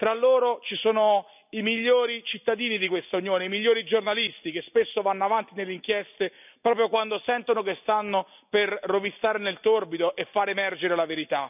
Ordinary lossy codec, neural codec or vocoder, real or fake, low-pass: none; none; real; 3.6 kHz